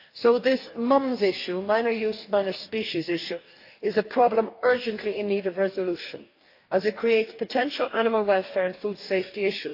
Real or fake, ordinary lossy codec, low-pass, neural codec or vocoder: fake; AAC, 32 kbps; 5.4 kHz; codec, 44.1 kHz, 2.6 kbps, DAC